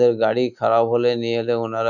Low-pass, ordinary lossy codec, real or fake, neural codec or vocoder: 7.2 kHz; none; real; none